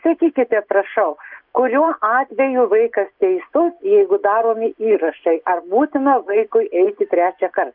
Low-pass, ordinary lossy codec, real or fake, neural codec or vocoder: 5.4 kHz; Opus, 24 kbps; real; none